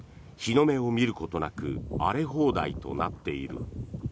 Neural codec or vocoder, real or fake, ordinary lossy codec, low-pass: none; real; none; none